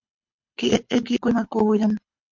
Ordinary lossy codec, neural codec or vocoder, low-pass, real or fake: MP3, 48 kbps; codec, 24 kHz, 6 kbps, HILCodec; 7.2 kHz; fake